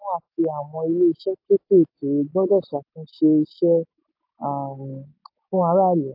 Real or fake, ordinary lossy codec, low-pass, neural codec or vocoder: real; AAC, 48 kbps; 5.4 kHz; none